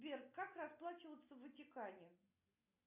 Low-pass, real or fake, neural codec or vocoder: 3.6 kHz; real; none